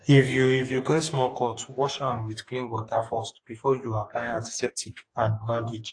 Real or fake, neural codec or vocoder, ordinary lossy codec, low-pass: fake; codec, 44.1 kHz, 2.6 kbps, DAC; AAC, 48 kbps; 9.9 kHz